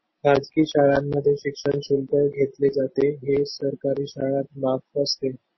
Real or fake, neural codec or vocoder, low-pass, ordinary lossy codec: real; none; 7.2 kHz; MP3, 24 kbps